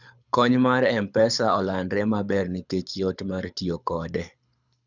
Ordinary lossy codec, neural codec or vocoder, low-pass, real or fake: none; codec, 24 kHz, 6 kbps, HILCodec; 7.2 kHz; fake